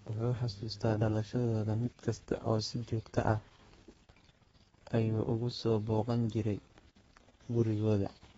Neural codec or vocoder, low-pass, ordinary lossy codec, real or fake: codec, 32 kHz, 1.9 kbps, SNAC; 14.4 kHz; AAC, 24 kbps; fake